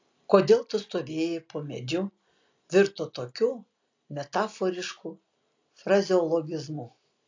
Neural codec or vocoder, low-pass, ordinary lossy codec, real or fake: none; 7.2 kHz; AAC, 48 kbps; real